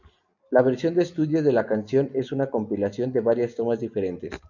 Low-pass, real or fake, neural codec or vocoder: 7.2 kHz; real; none